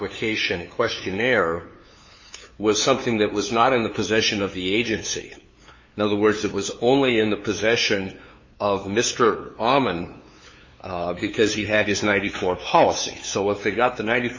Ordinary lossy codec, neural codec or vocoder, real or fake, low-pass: MP3, 32 kbps; codec, 16 kHz, 2 kbps, FunCodec, trained on LibriTTS, 25 frames a second; fake; 7.2 kHz